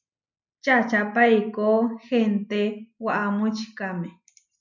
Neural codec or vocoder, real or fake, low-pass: none; real; 7.2 kHz